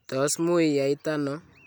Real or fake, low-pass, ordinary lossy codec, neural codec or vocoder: real; 19.8 kHz; none; none